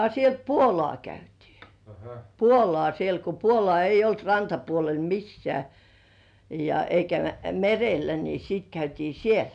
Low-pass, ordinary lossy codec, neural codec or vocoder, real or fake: 9.9 kHz; MP3, 96 kbps; none; real